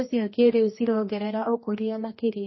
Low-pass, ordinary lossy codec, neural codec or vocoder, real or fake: 7.2 kHz; MP3, 24 kbps; codec, 16 kHz, 1 kbps, X-Codec, HuBERT features, trained on general audio; fake